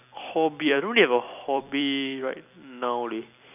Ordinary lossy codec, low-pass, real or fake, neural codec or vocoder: none; 3.6 kHz; fake; vocoder, 44.1 kHz, 128 mel bands every 256 samples, BigVGAN v2